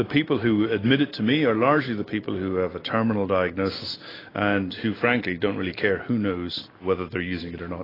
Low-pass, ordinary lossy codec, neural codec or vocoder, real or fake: 5.4 kHz; AAC, 24 kbps; none; real